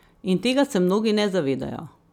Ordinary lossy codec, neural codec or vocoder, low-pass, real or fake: none; none; 19.8 kHz; real